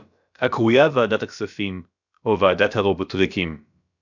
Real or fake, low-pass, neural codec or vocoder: fake; 7.2 kHz; codec, 16 kHz, about 1 kbps, DyCAST, with the encoder's durations